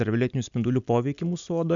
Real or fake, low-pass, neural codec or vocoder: real; 7.2 kHz; none